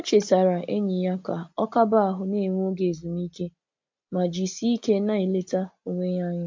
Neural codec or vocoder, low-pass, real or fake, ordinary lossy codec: none; 7.2 kHz; real; MP3, 48 kbps